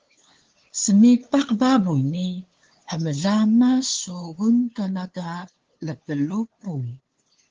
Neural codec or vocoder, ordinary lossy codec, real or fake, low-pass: codec, 16 kHz, 2 kbps, FunCodec, trained on LibriTTS, 25 frames a second; Opus, 16 kbps; fake; 7.2 kHz